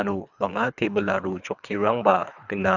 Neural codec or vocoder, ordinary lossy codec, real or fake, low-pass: codec, 24 kHz, 3 kbps, HILCodec; none; fake; 7.2 kHz